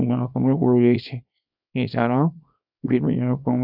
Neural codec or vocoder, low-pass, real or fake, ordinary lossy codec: codec, 24 kHz, 0.9 kbps, WavTokenizer, small release; 5.4 kHz; fake; none